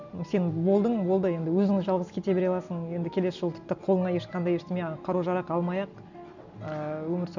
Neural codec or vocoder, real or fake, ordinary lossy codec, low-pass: none; real; none; 7.2 kHz